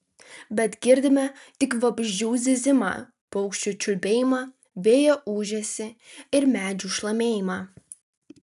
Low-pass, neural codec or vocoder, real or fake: 14.4 kHz; none; real